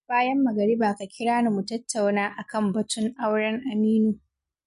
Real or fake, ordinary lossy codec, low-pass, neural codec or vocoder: real; MP3, 48 kbps; 14.4 kHz; none